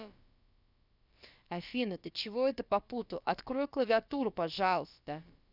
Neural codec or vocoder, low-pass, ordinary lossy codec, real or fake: codec, 16 kHz, about 1 kbps, DyCAST, with the encoder's durations; 5.4 kHz; none; fake